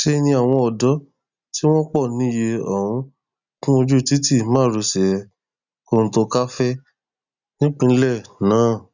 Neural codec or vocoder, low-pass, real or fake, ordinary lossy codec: none; 7.2 kHz; real; none